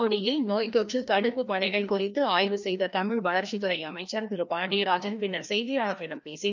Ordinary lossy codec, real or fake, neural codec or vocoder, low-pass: none; fake; codec, 16 kHz, 1 kbps, FreqCodec, larger model; 7.2 kHz